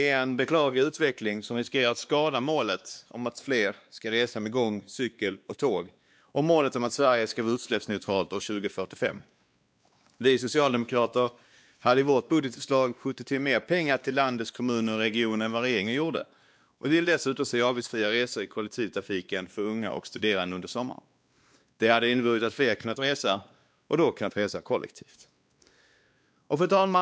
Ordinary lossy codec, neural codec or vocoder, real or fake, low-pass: none; codec, 16 kHz, 2 kbps, X-Codec, WavLM features, trained on Multilingual LibriSpeech; fake; none